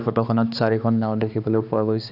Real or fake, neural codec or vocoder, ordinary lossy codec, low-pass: fake; codec, 16 kHz, 2 kbps, X-Codec, HuBERT features, trained on balanced general audio; none; 5.4 kHz